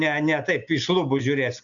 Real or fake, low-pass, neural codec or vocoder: real; 7.2 kHz; none